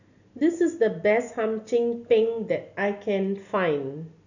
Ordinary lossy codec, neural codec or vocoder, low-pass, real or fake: none; none; 7.2 kHz; real